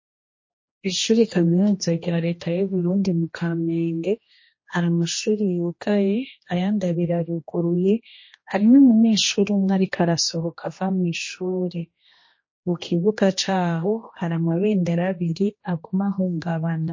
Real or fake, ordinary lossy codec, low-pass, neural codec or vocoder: fake; MP3, 32 kbps; 7.2 kHz; codec, 16 kHz, 1 kbps, X-Codec, HuBERT features, trained on general audio